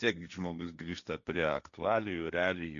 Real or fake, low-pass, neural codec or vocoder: fake; 7.2 kHz; codec, 16 kHz, 1.1 kbps, Voila-Tokenizer